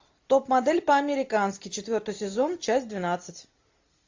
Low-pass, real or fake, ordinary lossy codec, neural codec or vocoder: 7.2 kHz; real; AAC, 48 kbps; none